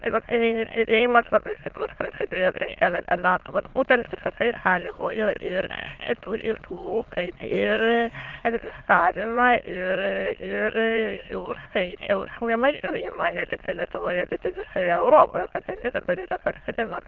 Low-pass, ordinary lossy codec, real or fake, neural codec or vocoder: 7.2 kHz; Opus, 16 kbps; fake; autoencoder, 22.05 kHz, a latent of 192 numbers a frame, VITS, trained on many speakers